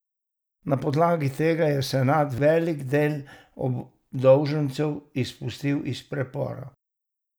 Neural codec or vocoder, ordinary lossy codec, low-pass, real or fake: none; none; none; real